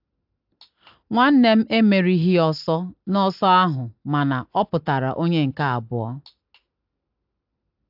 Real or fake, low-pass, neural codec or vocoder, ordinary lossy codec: real; 5.4 kHz; none; AAC, 48 kbps